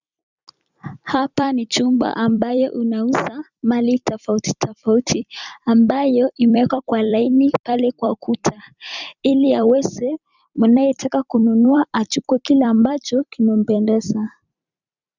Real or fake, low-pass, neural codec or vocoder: fake; 7.2 kHz; vocoder, 44.1 kHz, 128 mel bands every 256 samples, BigVGAN v2